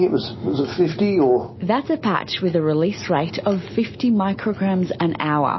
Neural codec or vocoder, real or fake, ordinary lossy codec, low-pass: none; real; MP3, 24 kbps; 7.2 kHz